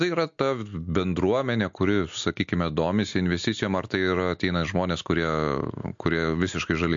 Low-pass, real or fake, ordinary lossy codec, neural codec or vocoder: 7.2 kHz; real; MP3, 48 kbps; none